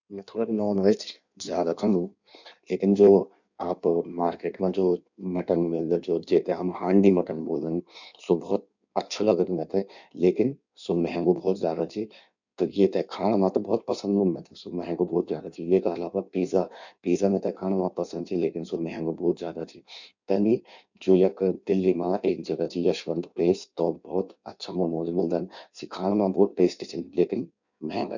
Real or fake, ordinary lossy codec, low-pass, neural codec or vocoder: fake; none; 7.2 kHz; codec, 16 kHz in and 24 kHz out, 1.1 kbps, FireRedTTS-2 codec